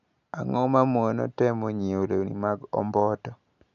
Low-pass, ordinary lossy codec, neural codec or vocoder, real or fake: 7.2 kHz; none; none; real